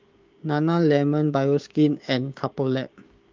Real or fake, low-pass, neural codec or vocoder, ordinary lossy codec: fake; 7.2 kHz; codec, 16 kHz, 6 kbps, DAC; Opus, 24 kbps